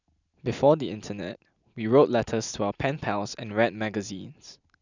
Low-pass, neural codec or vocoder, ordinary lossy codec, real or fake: 7.2 kHz; none; none; real